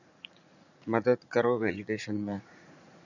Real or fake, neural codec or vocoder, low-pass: fake; vocoder, 44.1 kHz, 80 mel bands, Vocos; 7.2 kHz